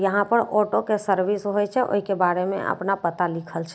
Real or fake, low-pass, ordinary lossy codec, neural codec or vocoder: real; none; none; none